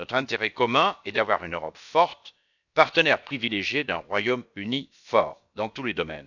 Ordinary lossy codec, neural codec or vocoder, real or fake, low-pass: none; codec, 16 kHz, about 1 kbps, DyCAST, with the encoder's durations; fake; 7.2 kHz